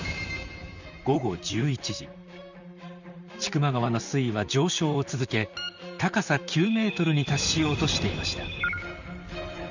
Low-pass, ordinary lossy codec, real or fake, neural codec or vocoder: 7.2 kHz; none; fake; vocoder, 44.1 kHz, 128 mel bands, Pupu-Vocoder